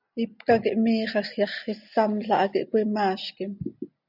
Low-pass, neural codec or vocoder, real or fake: 5.4 kHz; none; real